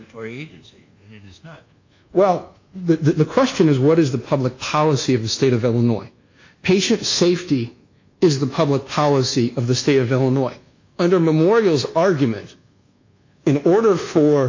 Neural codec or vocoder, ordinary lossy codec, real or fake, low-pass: codec, 24 kHz, 1.2 kbps, DualCodec; AAC, 48 kbps; fake; 7.2 kHz